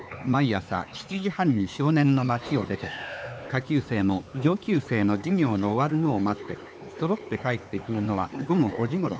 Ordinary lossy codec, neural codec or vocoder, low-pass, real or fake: none; codec, 16 kHz, 4 kbps, X-Codec, HuBERT features, trained on LibriSpeech; none; fake